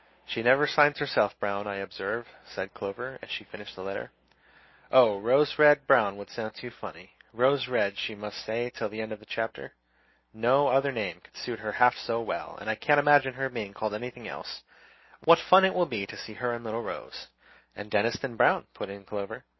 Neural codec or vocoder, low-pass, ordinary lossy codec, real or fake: none; 7.2 kHz; MP3, 24 kbps; real